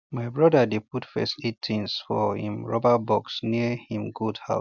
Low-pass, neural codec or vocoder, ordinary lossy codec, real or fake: 7.2 kHz; none; none; real